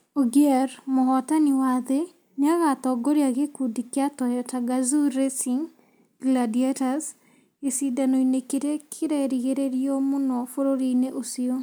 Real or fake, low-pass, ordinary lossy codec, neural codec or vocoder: real; none; none; none